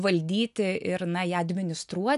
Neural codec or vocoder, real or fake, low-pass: none; real; 10.8 kHz